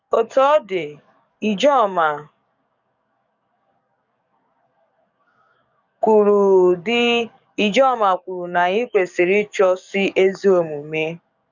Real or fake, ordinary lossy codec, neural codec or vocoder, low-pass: fake; none; codec, 44.1 kHz, 7.8 kbps, DAC; 7.2 kHz